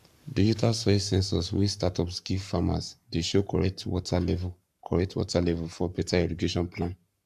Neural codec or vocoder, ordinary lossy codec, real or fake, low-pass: codec, 44.1 kHz, 7.8 kbps, Pupu-Codec; none; fake; 14.4 kHz